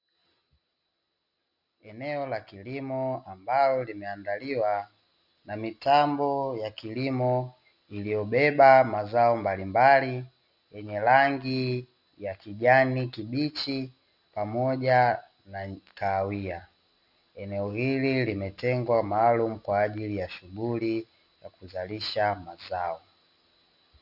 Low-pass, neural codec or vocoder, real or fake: 5.4 kHz; none; real